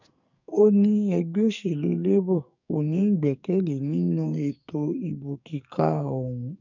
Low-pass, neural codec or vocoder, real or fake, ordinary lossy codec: 7.2 kHz; codec, 44.1 kHz, 2.6 kbps, SNAC; fake; none